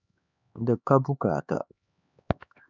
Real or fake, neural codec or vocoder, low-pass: fake; codec, 16 kHz, 2 kbps, X-Codec, HuBERT features, trained on LibriSpeech; 7.2 kHz